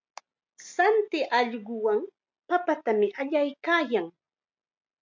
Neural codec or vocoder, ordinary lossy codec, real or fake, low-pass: none; MP3, 64 kbps; real; 7.2 kHz